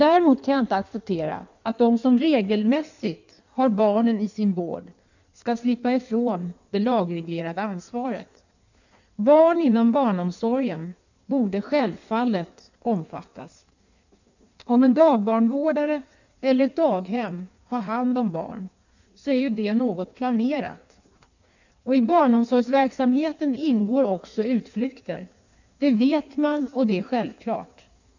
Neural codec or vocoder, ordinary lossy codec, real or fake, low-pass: codec, 16 kHz in and 24 kHz out, 1.1 kbps, FireRedTTS-2 codec; none; fake; 7.2 kHz